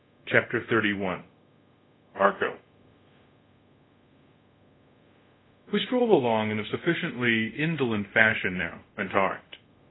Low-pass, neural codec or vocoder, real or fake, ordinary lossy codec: 7.2 kHz; codec, 24 kHz, 0.5 kbps, DualCodec; fake; AAC, 16 kbps